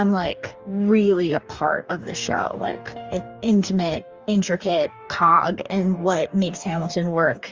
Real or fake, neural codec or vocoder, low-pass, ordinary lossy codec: fake; codec, 44.1 kHz, 2.6 kbps, DAC; 7.2 kHz; Opus, 32 kbps